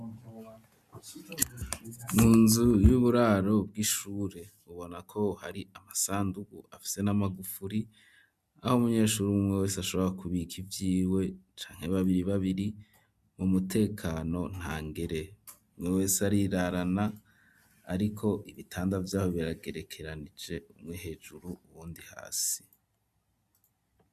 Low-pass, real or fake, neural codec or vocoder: 14.4 kHz; real; none